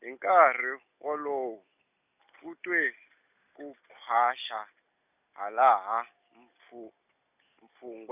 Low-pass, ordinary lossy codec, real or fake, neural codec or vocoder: 3.6 kHz; none; real; none